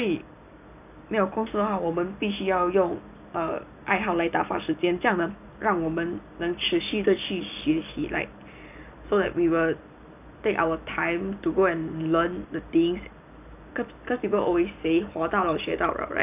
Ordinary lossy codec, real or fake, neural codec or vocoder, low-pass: none; real; none; 3.6 kHz